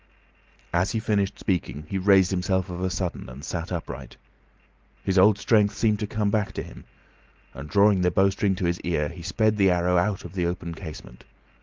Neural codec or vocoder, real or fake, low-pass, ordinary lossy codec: none; real; 7.2 kHz; Opus, 24 kbps